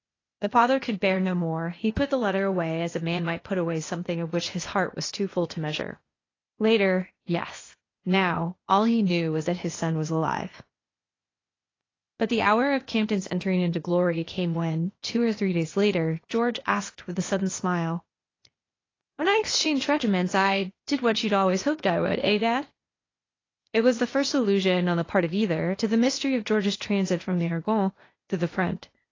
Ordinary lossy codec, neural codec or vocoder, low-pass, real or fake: AAC, 32 kbps; codec, 16 kHz, 0.8 kbps, ZipCodec; 7.2 kHz; fake